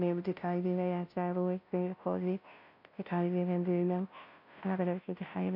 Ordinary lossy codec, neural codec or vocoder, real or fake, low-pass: none; codec, 16 kHz, 0.5 kbps, FunCodec, trained on Chinese and English, 25 frames a second; fake; 5.4 kHz